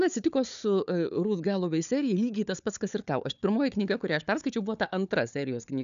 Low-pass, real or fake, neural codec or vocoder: 7.2 kHz; fake; codec, 16 kHz, 4 kbps, FunCodec, trained on Chinese and English, 50 frames a second